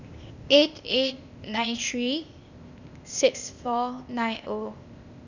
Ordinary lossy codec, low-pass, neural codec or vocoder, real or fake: none; 7.2 kHz; codec, 16 kHz, 0.8 kbps, ZipCodec; fake